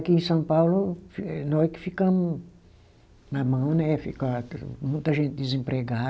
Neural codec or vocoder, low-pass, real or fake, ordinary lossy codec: none; none; real; none